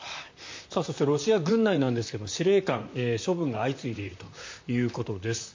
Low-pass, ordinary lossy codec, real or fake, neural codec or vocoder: 7.2 kHz; MP3, 48 kbps; fake; vocoder, 44.1 kHz, 128 mel bands, Pupu-Vocoder